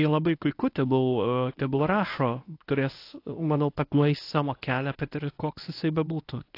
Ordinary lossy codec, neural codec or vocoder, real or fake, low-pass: AAC, 32 kbps; codec, 24 kHz, 0.9 kbps, WavTokenizer, medium speech release version 1; fake; 5.4 kHz